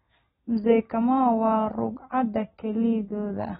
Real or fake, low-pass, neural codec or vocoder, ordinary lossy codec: real; 7.2 kHz; none; AAC, 16 kbps